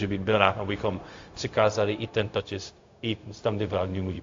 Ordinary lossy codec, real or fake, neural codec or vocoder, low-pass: AAC, 48 kbps; fake; codec, 16 kHz, 0.4 kbps, LongCat-Audio-Codec; 7.2 kHz